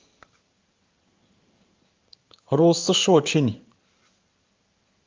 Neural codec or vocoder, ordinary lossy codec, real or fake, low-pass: codec, 16 kHz in and 24 kHz out, 1 kbps, XY-Tokenizer; Opus, 24 kbps; fake; 7.2 kHz